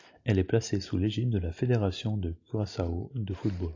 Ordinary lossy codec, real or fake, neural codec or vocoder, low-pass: AAC, 48 kbps; real; none; 7.2 kHz